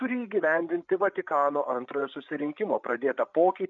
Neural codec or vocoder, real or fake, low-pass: codec, 16 kHz, 8 kbps, FreqCodec, larger model; fake; 7.2 kHz